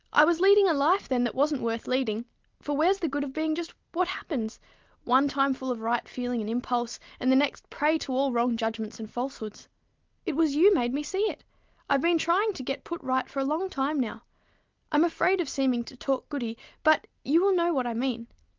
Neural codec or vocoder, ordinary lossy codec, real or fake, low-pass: none; Opus, 32 kbps; real; 7.2 kHz